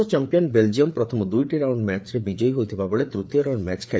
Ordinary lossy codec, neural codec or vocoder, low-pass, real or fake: none; codec, 16 kHz, 4 kbps, FreqCodec, larger model; none; fake